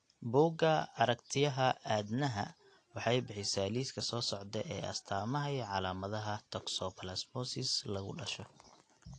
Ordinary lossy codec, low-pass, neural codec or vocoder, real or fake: AAC, 48 kbps; 9.9 kHz; none; real